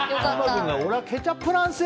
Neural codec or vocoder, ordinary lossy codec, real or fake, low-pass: none; none; real; none